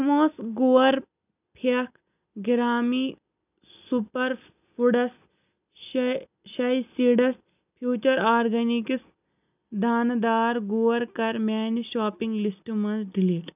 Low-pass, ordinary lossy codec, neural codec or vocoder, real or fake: 3.6 kHz; none; none; real